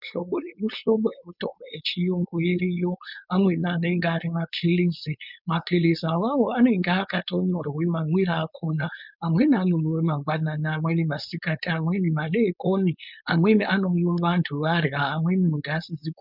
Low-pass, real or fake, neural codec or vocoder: 5.4 kHz; fake; codec, 16 kHz, 4.8 kbps, FACodec